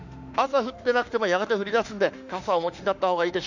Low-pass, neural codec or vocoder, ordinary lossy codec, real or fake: 7.2 kHz; autoencoder, 48 kHz, 32 numbers a frame, DAC-VAE, trained on Japanese speech; none; fake